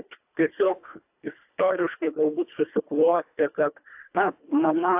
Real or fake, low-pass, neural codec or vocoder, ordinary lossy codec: fake; 3.6 kHz; codec, 24 kHz, 1.5 kbps, HILCodec; AAC, 32 kbps